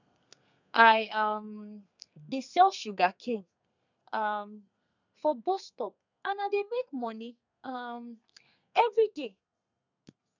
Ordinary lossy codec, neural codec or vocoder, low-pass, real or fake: none; codec, 32 kHz, 1.9 kbps, SNAC; 7.2 kHz; fake